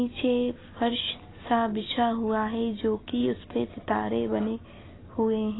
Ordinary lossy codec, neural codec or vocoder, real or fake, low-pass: AAC, 16 kbps; none; real; 7.2 kHz